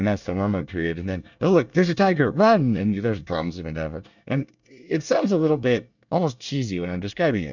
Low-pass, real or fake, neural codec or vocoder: 7.2 kHz; fake; codec, 24 kHz, 1 kbps, SNAC